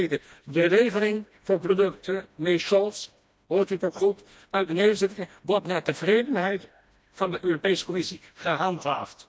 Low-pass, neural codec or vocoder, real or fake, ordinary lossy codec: none; codec, 16 kHz, 1 kbps, FreqCodec, smaller model; fake; none